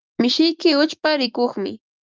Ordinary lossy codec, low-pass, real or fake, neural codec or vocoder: Opus, 32 kbps; 7.2 kHz; fake; autoencoder, 48 kHz, 128 numbers a frame, DAC-VAE, trained on Japanese speech